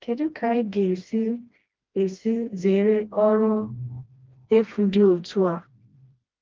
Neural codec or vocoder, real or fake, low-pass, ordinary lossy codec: codec, 16 kHz, 1 kbps, FreqCodec, smaller model; fake; 7.2 kHz; Opus, 32 kbps